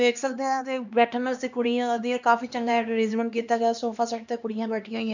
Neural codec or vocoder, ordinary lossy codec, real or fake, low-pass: codec, 16 kHz, 2 kbps, X-Codec, HuBERT features, trained on LibriSpeech; none; fake; 7.2 kHz